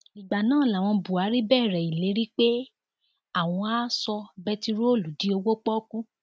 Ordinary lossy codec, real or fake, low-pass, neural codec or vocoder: none; real; none; none